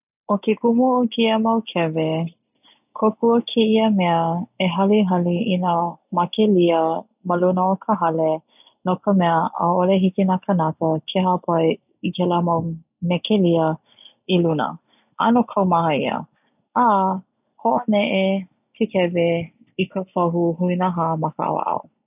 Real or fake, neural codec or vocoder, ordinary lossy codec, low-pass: real; none; none; 3.6 kHz